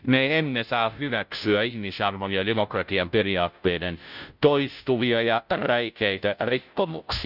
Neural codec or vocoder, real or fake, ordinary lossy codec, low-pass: codec, 16 kHz, 0.5 kbps, FunCodec, trained on Chinese and English, 25 frames a second; fake; none; 5.4 kHz